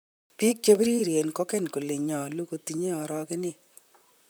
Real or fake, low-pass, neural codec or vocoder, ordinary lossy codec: fake; none; vocoder, 44.1 kHz, 128 mel bands, Pupu-Vocoder; none